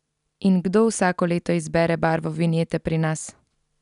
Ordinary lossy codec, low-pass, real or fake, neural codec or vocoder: none; 10.8 kHz; real; none